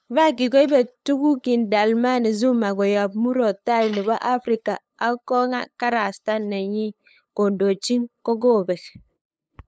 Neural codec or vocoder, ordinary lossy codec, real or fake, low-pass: codec, 16 kHz, 8 kbps, FunCodec, trained on LibriTTS, 25 frames a second; none; fake; none